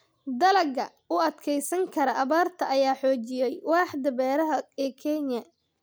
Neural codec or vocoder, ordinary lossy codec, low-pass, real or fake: none; none; none; real